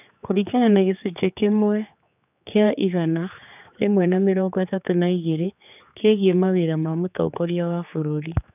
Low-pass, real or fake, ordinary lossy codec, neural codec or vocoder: 3.6 kHz; fake; none; codec, 16 kHz, 4 kbps, X-Codec, HuBERT features, trained on general audio